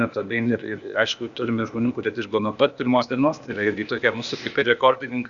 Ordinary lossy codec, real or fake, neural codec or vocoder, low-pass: Opus, 64 kbps; fake; codec, 16 kHz, 0.8 kbps, ZipCodec; 7.2 kHz